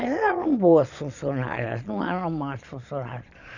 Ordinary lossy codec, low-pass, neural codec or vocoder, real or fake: none; 7.2 kHz; vocoder, 22.05 kHz, 80 mel bands, Vocos; fake